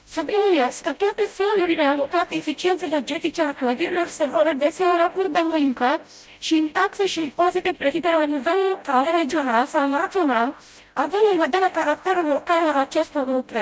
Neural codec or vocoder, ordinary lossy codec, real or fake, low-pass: codec, 16 kHz, 0.5 kbps, FreqCodec, smaller model; none; fake; none